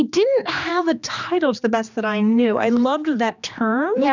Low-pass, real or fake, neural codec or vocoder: 7.2 kHz; fake; codec, 16 kHz, 2 kbps, X-Codec, HuBERT features, trained on general audio